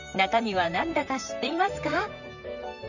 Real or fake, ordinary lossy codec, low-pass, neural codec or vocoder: fake; none; 7.2 kHz; vocoder, 44.1 kHz, 128 mel bands, Pupu-Vocoder